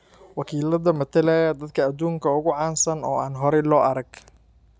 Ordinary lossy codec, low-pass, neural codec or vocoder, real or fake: none; none; none; real